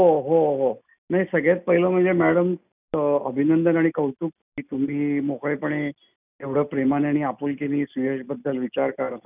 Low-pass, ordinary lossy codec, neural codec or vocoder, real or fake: 3.6 kHz; none; none; real